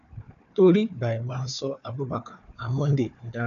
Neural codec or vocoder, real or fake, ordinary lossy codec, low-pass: codec, 16 kHz, 4 kbps, FunCodec, trained on Chinese and English, 50 frames a second; fake; none; 7.2 kHz